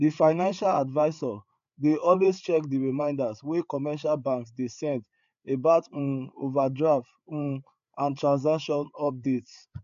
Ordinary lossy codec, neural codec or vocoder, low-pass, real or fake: MP3, 64 kbps; codec, 16 kHz, 8 kbps, FreqCodec, larger model; 7.2 kHz; fake